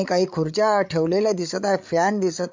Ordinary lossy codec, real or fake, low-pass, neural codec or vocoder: MP3, 64 kbps; fake; 7.2 kHz; codec, 16 kHz, 8 kbps, FreqCodec, larger model